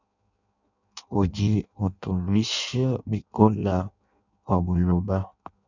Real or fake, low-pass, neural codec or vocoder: fake; 7.2 kHz; codec, 16 kHz in and 24 kHz out, 0.6 kbps, FireRedTTS-2 codec